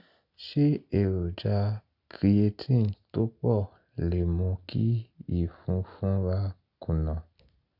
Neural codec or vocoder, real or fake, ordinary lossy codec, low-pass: none; real; none; 5.4 kHz